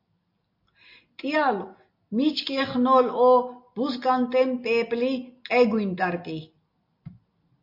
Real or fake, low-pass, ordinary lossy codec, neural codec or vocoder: real; 5.4 kHz; MP3, 32 kbps; none